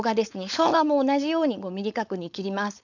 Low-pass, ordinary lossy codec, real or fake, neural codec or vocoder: 7.2 kHz; none; fake; codec, 16 kHz, 4.8 kbps, FACodec